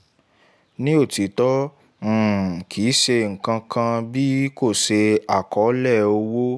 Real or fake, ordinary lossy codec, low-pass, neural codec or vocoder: real; none; none; none